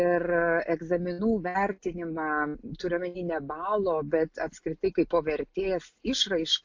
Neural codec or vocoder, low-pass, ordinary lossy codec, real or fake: none; 7.2 kHz; AAC, 48 kbps; real